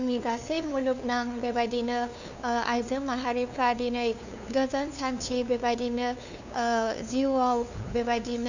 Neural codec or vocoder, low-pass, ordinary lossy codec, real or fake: codec, 16 kHz, 2 kbps, FunCodec, trained on LibriTTS, 25 frames a second; 7.2 kHz; none; fake